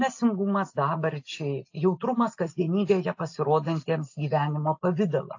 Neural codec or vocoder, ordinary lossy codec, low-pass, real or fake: none; AAC, 48 kbps; 7.2 kHz; real